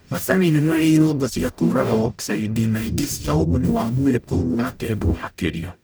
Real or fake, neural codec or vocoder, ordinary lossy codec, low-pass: fake; codec, 44.1 kHz, 0.9 kbps, DAC; none; none